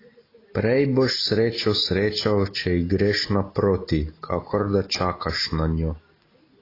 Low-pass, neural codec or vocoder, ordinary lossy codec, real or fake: 5.4 kHz; none; AAC, 24 kbps; real